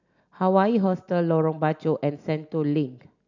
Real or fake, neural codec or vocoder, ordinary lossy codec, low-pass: real; none; none; 7.2 kHz